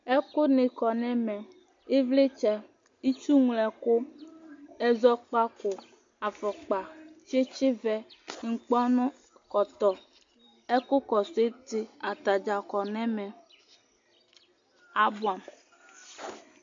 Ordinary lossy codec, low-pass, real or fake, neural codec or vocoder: MP3, 48 kbps; 7.2 kHz; real; none